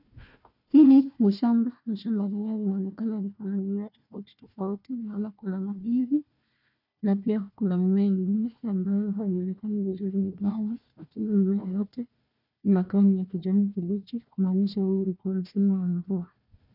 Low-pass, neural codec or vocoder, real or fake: 5.4 kHz; codec, 16 kHz, 1 kbps, FunCodec, trained on Chinese and English, 50 frames a second; fake